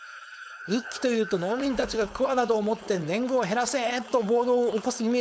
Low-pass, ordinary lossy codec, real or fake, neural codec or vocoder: none; none; fake; codec, 16 kHz, 4.8 kbps, FACodec